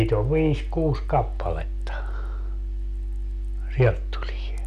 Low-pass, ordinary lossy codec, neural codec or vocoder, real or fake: 14.4 kHz; none; none; real